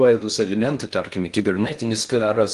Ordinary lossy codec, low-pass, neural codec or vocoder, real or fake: Opus, 24 kbps; 10.8 kHz; codec, 16 kHz in and 24 kHz out, 0.6 kbps, FocalCodec, streaming, 2048 codes; fake